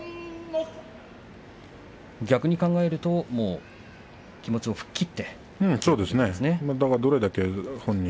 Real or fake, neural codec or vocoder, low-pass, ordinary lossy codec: real; none; none; none